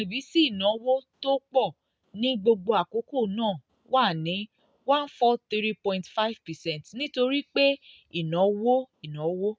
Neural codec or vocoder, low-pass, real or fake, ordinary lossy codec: none; none; real; none